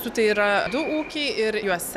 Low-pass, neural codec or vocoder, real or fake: 14.4 kHz; none; real